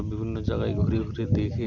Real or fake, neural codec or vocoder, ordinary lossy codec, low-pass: real; none; none; 7.2 kHz